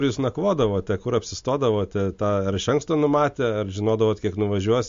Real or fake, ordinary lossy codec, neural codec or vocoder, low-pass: real; MP3, 48 kbps; none; 7.2 kHz